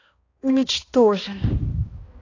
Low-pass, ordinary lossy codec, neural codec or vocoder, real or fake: 7.2 kHz; AAC, 32 kbps; codec, 16 kHz, 1 kbps, X-Codec, HuBERT features, trained on balanced general audio; fake